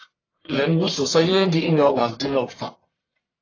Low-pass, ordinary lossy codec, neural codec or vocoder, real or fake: 7.2 kHz; AAC, 32 kbps; codec, 44.1 kHz, 1.7 kbps, Pupu-Codec; fake